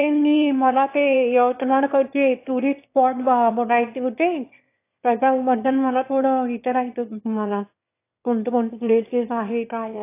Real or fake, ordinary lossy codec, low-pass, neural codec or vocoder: fake; AAC, 24 kbps; 3.6 kHz; autoencoder, 22.05 kHz, a latent of 192 numbers a frame, VITS, trained on one speaker